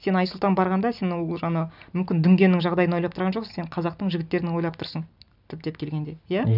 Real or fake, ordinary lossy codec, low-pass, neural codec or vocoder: real; none; 5.4 kHz; none